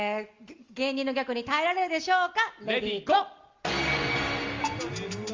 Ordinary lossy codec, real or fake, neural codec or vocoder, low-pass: Opus, 32 kbps; real; none; 7.2 kHz